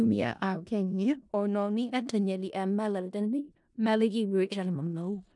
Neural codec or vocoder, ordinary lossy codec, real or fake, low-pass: codec, 16 kHz in and 24 kHz out, 0.4 kbps, LongCat-Audio-Codec, four codebook decoder; none; fake; 10.8 kHz